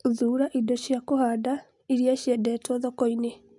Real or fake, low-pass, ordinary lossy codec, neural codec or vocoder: real; 10.8 kHz; MP3, 96 kbps; none